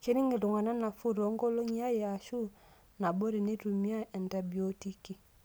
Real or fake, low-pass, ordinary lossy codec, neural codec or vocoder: real; none; none; none